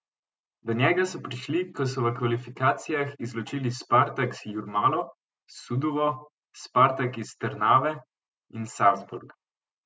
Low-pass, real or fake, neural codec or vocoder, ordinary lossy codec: none; real; none; none